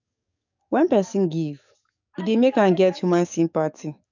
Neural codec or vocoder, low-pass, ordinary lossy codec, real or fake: codec, 44.1 kHz, 7.8 kbps, DAC; 7.2 kHz; none; fake